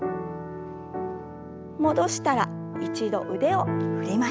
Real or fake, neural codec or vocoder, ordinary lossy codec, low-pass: real; none; none; none